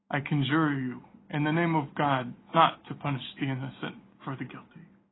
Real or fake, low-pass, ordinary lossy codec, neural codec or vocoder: real; 7.2 kHz; AAC, 16 kbps; none